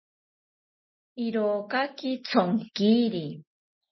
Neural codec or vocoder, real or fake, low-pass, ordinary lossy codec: none; real; 7.2 kHz; MP3, 24 kbps